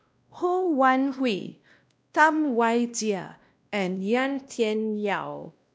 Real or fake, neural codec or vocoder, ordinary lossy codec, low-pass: fake; codec, 16 kHz, 1 kbps, X-Codec, WavLM features, trained on Multilingual LibriSpeech; none; none